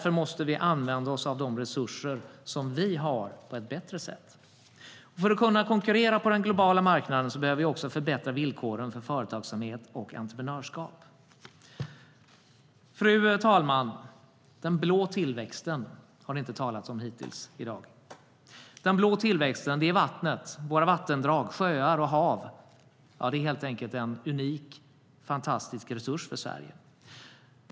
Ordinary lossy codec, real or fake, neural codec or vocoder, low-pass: none; real; none; none